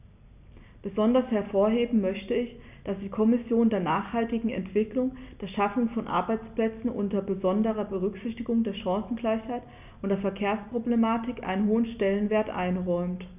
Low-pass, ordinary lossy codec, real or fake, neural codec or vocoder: 3.6 kHz; none; real; none